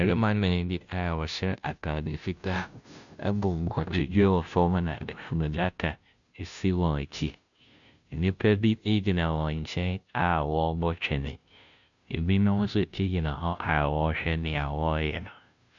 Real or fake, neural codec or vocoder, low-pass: fake; codec, 16 kHz, 0.5 kbps, FunCodec, trained on Chinese and English, 25 frames a second; 7.2 kHz